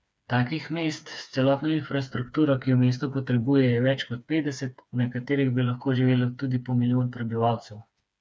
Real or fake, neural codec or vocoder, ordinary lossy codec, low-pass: fake; codec, 16 kHz, 4 kbps, FreqCodec, smaller model; none; none